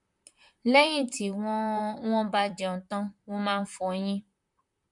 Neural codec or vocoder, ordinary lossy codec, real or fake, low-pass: vocoder, 44.1 kHz, 128 mel bands, Pupu-Vocoder; MP3, 64 kbps; fake; 10.8 kHz